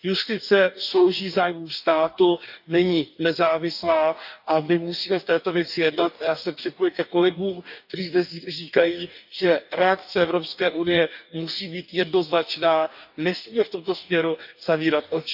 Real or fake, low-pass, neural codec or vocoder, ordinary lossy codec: fake; 5.4 kHz; codec, 44.1 kHz, 2.6 kbps, DAC; none